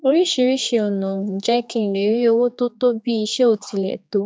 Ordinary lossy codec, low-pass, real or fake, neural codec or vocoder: none; none; fake; codec, 16 kHz, 4 kbps, X-Codec, HuBERT features, trained on general audio